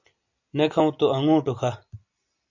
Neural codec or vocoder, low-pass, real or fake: none; 7.2 kHz; real